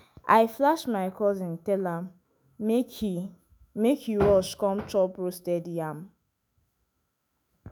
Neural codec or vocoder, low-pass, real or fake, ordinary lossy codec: autoencoder, 48 kHz, 128 numbers a frame, DAC-VAE, trained on Japanese speech; none; fake; none